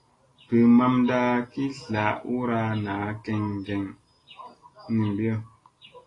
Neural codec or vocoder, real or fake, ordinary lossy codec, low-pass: none; real; AAC, 32 kbps; 10.8 kHz